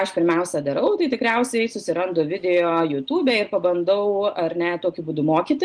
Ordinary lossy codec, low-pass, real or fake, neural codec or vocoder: Opus, 24 kbps; 9.9 kHz; real; none